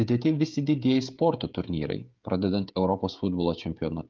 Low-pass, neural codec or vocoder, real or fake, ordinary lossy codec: 7.2 kHz; codec, 16 kHz, 16 kbps, FreqCodec, smaller model; fake; Opus, 32 kbps